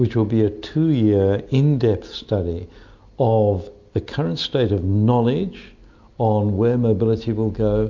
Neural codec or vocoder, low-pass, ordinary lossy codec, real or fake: none; 7.2 kHz; MP3, 64 kbps; real